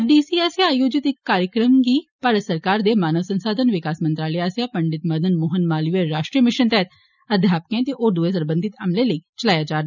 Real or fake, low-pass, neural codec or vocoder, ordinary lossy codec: real; 7.2 kHz; none; none